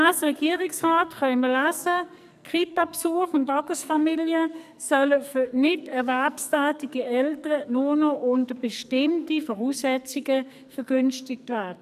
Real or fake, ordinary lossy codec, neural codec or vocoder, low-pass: fake; none; codec, 44.1 kHz, 2.6 kbps, SNAC; 14.4 kHz